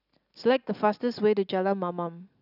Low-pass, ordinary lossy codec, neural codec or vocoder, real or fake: 5.4 kHz; none; none; real